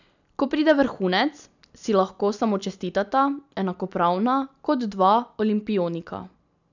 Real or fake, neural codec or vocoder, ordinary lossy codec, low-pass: real; none; none; 7.2 kHz